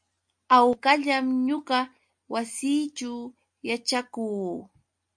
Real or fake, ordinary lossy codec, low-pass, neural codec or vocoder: real; MP3, 48 kbps; 9.9 kHz; none